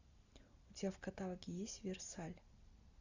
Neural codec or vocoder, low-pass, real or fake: none; 7.2 kHz; real